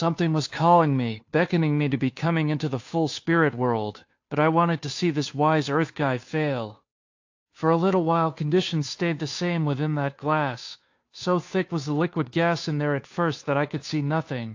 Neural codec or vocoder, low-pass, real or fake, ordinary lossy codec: codec, 16 kHz, 2 kbps, FunCodec, trained on Chinese and English, 25 frames a second; 7.2 kHz; fake; AAC, 48 kbps